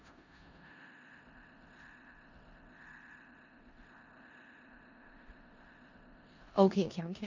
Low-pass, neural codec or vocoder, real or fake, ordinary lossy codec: 7.2 kHz; codec, 16 kHz in and 24 kHz out, 0.4 kbps, LongCat-Audio-Codec, four codebook decoder; fake; none